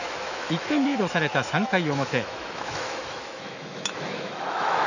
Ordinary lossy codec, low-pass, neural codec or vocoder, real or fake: none; 7.2 kHz; autoencoder, 48 kHz, 128 numbers a frame, DAC-VAE, trained on Japanese speech; fake